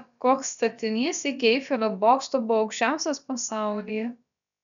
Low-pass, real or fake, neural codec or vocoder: 7.2 kHz; fake; codec, 16 kHz, about 1 kbps, DyCAST, with the encoder's durations